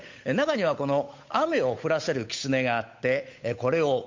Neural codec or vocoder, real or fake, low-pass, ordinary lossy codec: codec, 16 kHz, 8 kbps, FunCodec, trained on Chinese and English, 25 frames a second; fake; 7.2 kHz; MP3, 48 kbps